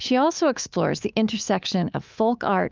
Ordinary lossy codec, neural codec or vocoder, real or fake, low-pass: Opus, 24 kbps; codec, 24 kHz, 3.1 kbps, DualCodec; fake; 7.2 kHz